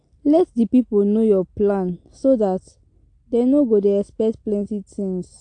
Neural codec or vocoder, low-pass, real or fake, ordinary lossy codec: none; 9.9 kHz; real; AAC, 48 kbps